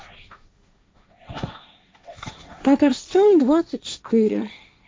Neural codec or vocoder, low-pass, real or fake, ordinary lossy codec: codec, 16 kHz, 1.1 kbps, Voila-Tokenizer; none; fake; none